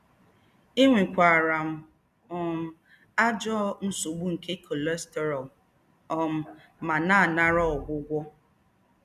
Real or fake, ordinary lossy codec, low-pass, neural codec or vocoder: real; none; 14.4 kHz; none